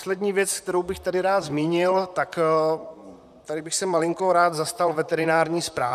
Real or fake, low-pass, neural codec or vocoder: fake; 14.4 kHz; vocoder, 44.1 kHz, 128 mel bands, Pupu-Vocoder